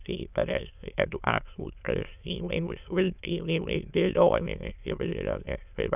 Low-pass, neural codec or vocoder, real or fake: 3.6 kHz; autoencoder, 22.05 kHz, a latent of 192 numbers a frame, VITS, trained on many speakers; fake